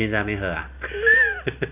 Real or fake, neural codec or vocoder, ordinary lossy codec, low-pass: real; none; none; 3.6 kHz